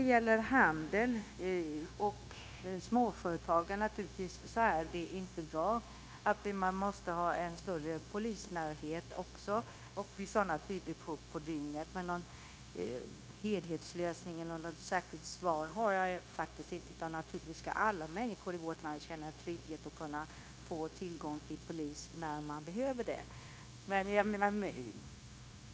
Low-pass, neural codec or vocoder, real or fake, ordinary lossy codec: none; codec, 16 kHz, 0.9 kbps, LongCat-Audio-Codec; fake; none